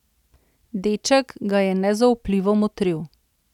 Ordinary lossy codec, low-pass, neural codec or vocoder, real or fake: none; 19.8 kHz; none; real